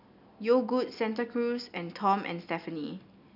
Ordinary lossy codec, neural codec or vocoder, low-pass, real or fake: none; none; 5.4 kHz; real